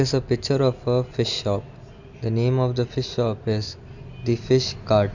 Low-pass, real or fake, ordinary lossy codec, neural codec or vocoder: 7.2 kHz; real; none; none